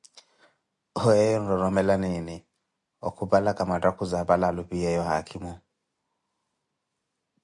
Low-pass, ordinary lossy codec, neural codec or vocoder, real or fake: 10.8 kHz; MP3, 64 kbps; none; real